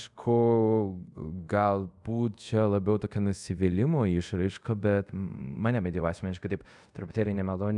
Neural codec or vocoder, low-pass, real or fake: codec, 24 kHz, 0.5 kbps, DualCodec; 10.8 kHz; fake